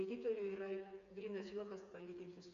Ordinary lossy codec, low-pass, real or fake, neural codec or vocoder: AAC, 48 kbps; 7.2 kHz; fake; codec, 16 kHz, 4 kbps, FreqCodec, smaller model